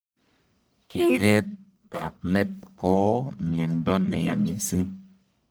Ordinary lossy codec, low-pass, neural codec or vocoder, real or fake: none; none; codec, 44.1 kHz, 1.7 kbps, Pupu-Codec; fake